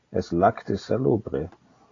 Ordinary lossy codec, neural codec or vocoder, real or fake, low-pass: AAC, 32 kbps; none; real; 7.2 kHz